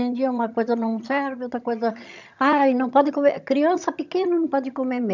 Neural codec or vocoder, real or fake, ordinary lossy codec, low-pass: vocoder, 22.05 kHz, 80 mel bands, HiFi-GAN; fake; none; 7.2 kHz